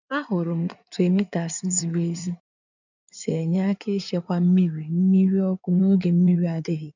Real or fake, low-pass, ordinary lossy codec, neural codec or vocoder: fake; 7.2 kHz; none; codec, 16 kHz in and 24 kHz out, 2.2 kbps, FireRedTTS-2 codec